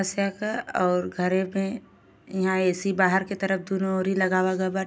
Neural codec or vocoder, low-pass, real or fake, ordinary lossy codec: none; none; real; none